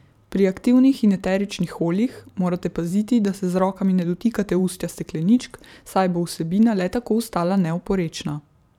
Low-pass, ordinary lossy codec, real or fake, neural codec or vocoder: 19.8 kHz; none; fake; vocoder, 44.1 kHz, 128 mel bands every 512 samples, BigVGAN v2